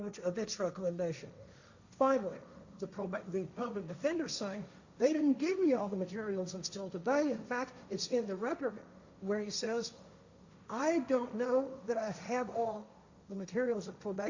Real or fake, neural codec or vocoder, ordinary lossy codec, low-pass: fake; codec, 16 kHz, 1.1 kbps, Voila-Tokenizer; Opus, 64 kbps; 7.2 kHz